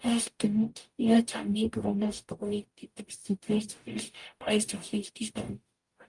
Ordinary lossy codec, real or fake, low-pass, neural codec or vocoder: Opus, 32 kbps; fake; 10.8 kHz; codec, 44.1 kHz, 0.9 kbps, DAC